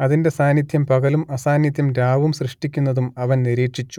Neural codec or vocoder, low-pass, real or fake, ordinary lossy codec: none; 19.8 kHz; real; none